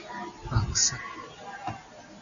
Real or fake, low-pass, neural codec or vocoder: real; 7.2 kHz; none